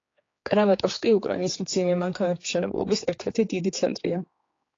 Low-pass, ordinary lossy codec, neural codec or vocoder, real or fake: 7.2 kHz; AAC, 32 kbps; codec, 16 kHz, 4 kbps, X-Codec, HuBERT features, trained on general audio; fake